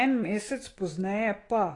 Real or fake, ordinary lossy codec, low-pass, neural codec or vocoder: fake; AAC, 32 kbps; 10.8 kHz; autoencoder, 48 kHz, 128 numbers a frame, DAC-VAE, trained on Japanese speech